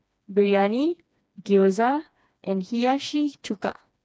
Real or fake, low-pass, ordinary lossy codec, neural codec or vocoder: fake; none; none; codec, 16 kHz, 2 kbps, FreqCodec, smaller model